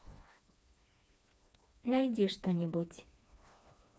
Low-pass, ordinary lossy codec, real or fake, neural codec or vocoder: none; none; fake; codec, 16 kHz, 2 kbps, FreqCodec, smaller model